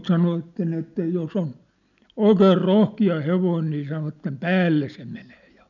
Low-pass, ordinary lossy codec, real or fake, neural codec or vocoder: 7.2 kHz; none; real; none